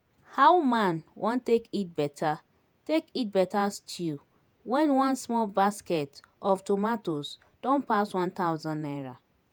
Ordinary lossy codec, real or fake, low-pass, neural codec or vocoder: none; fake; none; vocoder, 48 kHz, 128 mel bands, Vocos